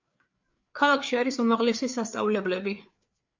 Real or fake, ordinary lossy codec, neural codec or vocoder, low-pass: fake; MP3, 48 kbps; codec, 16 kHz, 4 kbps, FreqCodec, larger model; 7.2 kHz